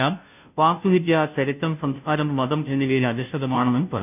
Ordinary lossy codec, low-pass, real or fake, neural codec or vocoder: none; 3.6 kHz; fake; codec, 16 kHz, 0.5 kbps, FunCodec, trained on Chinese and English, 25 frames a second